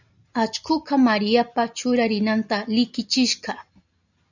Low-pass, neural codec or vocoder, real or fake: 7.2 kHz; none; real